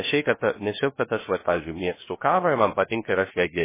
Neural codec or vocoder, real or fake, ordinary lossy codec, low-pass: codec, 16 kHz, 0.3 kbps, FocalCodec; fake; MP3, 16 kbps; 3.6 kHz